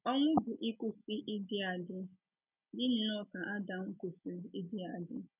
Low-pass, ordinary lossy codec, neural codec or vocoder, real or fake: 3.6 kHz; none; none; real